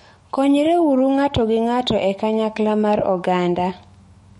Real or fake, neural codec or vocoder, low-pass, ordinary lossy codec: fake; autoencoder, 48 kHz, 128 numbers a frame, DAC-VAE, trained on Japanese speech; 19.8 kHz; MP3, 48 kbps